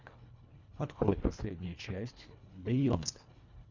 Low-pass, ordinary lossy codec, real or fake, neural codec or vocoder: 7.2 kHz; Opus, 64 kbps; fake; codec, 24 kHz, 1.5 kbps, HILCodec